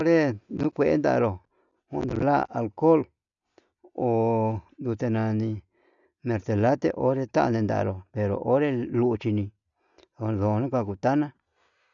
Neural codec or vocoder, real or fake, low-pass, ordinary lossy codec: none; real; 7.2 kHz; none